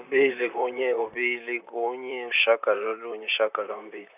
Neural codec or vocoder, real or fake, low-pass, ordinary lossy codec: vocoder, 44.1 kHz, 128 mel bands, Pupu-Vocoder; fake; 3.6 kHz; none